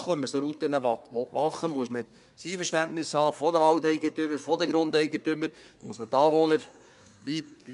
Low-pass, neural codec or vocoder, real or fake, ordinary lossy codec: 10.8 kHz; codec, 24 kHz, 1 kbps, SNAC; fake; MP3, 96 kbps